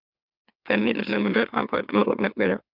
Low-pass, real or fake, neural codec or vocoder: 5.4 kHz; fake; autoencoder, 44.1 kHz, a latent of 192 numbers a frame, MeloTTS